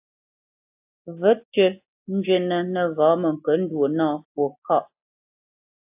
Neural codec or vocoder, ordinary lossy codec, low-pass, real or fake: none; AAC, 32 kbps; 3.6 kHz; real